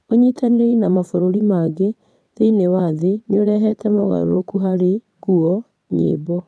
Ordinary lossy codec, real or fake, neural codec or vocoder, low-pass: none; fake; vocoder, 22.05 kHz, 80 mel bands, WaveNeXt; none